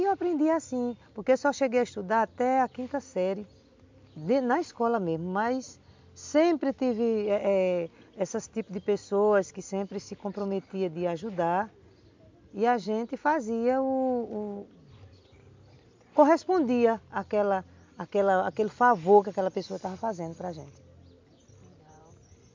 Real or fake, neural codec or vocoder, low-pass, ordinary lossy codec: real; none; 7.2 kHz; MP3, 64 kbps